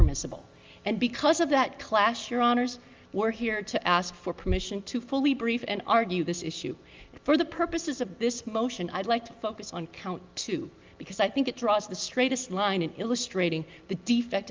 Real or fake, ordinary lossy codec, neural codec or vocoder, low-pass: real; Opus, 32 kbps; none; 7.2 kHz